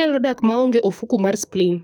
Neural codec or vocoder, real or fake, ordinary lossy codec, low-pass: codec, 44.1 kHz, 2.6 kbps, SNAC; fake; none; none